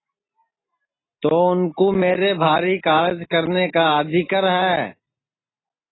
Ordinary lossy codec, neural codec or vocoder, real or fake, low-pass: AAC, 16 kbps; none; real; 7.2 kHz